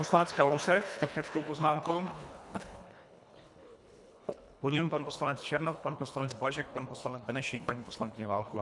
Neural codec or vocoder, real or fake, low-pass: codec, 24 kHz, 1.5 kbps, HILCodec; fake; 10.8 kHz